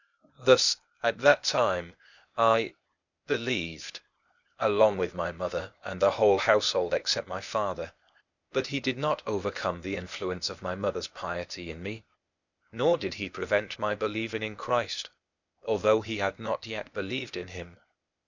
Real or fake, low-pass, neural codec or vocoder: fake; 7.2 kHz; codec, 16 kHz, 0.8 kbps, ZipCodec